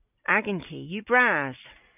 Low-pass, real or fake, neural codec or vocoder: 3.6 kHz; real; none